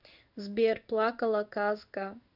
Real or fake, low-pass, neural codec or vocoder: fake; 5.4 kHz; codec, 16 kHz in and 24 kHz out, 1 kbps, XY-Tokenizer